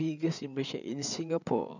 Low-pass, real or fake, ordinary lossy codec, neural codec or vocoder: 7.2 kHz; fake; none; codec, 16 kHz, 8 kbps, FreqCodec, larger model